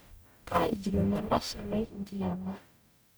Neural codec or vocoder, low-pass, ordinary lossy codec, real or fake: codec, 44.1 kHz, 0.9 kbps, DAC; none; none; fake